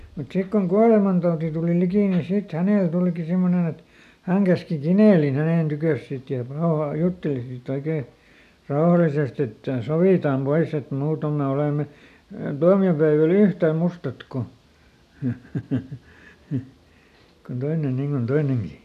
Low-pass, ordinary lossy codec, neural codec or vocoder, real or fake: 14.4 kHz; none; none; real